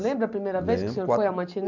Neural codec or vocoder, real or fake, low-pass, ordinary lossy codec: none; real; 7.2 kHz; none